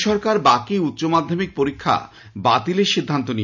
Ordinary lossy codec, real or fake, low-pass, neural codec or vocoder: none; real; 7.2 kHz; none